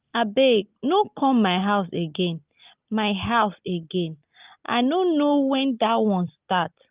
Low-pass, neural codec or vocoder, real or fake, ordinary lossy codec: 3.6 kHz; none; real; Opus, 32 kbps